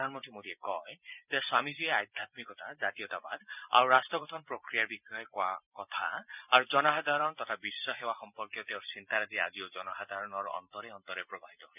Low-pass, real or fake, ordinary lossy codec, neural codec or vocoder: 3.6 kHz; fake; none; vocoder, 44.1 kHz, 128 mel bands every 256 samples, BigVGAN v2